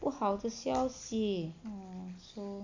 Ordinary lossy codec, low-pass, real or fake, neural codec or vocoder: AAC, 48 kbps; 7.2 kHz; real; none